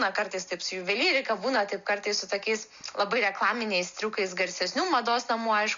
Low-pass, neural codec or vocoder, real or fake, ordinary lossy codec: 7.2 kHz; none; real; Opus, 64 kbps